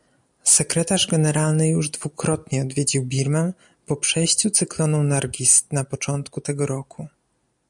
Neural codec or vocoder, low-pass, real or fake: none; 10.8 kHz; real